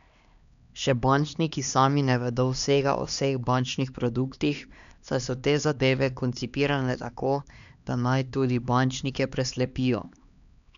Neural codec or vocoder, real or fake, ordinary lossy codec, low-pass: codec, 16 kHz, 2 kbps, X-Codec, HuBERT features, trained on LibriSpeech; fake; MP3, 96 kbps; 7.2 kHz